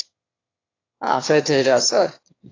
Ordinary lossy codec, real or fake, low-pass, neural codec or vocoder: AAC, 32 kbps; fake; 7.2 kHz; autoencoder, 22.05 kHz, a latent of 192 numbers a frame, VITS, trained on one speaker